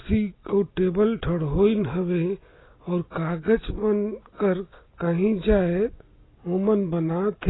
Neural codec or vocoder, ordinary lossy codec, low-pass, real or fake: none; AAC, 16 kbps; 7.2 kHz; real